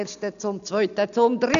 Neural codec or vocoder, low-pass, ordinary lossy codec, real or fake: none; 7.2 kHz; none; real